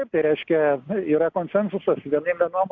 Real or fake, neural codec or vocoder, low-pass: real; none; 7.2 kHz